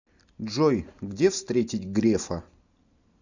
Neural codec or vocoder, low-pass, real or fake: none; 7.2 kHz; real